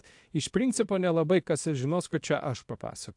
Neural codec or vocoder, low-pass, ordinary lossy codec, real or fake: codec, 24 kHz, 0.9 kbps, WavTokenizer, small release; 10.8 kHz; AAC, 64 kbps; fake